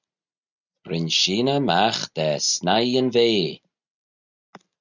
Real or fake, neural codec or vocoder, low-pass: real; none; 7.2 kHz